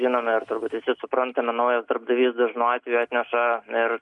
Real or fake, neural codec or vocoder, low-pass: real; none; 10.8 kHz